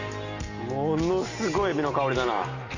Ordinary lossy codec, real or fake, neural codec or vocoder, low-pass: none; real; none; 7.2 kHz